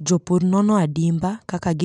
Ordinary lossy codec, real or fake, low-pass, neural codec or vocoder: none; real; 10.8 kHz; none